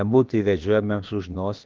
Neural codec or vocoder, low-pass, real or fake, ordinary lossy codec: codec, 16 kHz, about 1 kbps, DyCAST, with the encoder's durations; 7.2 kHz; fake; Opus, 16 kbps